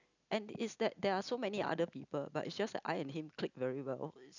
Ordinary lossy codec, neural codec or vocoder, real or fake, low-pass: none; none; real; 7.2 kHz